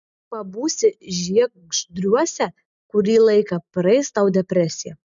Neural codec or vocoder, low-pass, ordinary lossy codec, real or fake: none; 7.2 kHz; MP3, 96 kbps; real